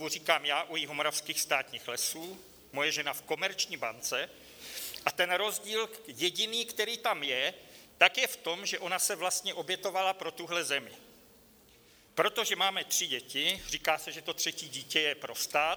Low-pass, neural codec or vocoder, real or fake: 19.8 kHz; none; real